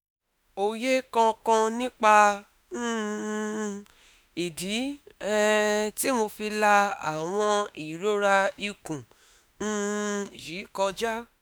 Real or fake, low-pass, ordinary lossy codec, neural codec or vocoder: fake; none; none; autoencoder, 48 kHz, 32 numbers a frame, DAC-VAE, trained on Japanese speech